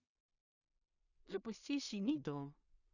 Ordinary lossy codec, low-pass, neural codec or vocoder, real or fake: none; 7.2 kHz; codec, 16 kHz in and 24 kHz out, 0.4 kbps, LongCat-Audio-Codec, two codebook decoder; fake